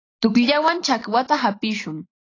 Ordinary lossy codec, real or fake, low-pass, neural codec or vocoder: AAC, 32 kbps; real; 7.2 kHz; none